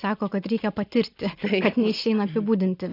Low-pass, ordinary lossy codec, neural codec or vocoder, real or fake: 5.4 kHz; AAC, 32 kbps; none; real